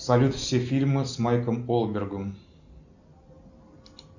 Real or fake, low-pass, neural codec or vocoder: real; 7.2 kHz; none